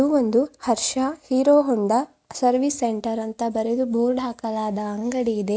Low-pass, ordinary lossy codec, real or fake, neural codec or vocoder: none; none; real; none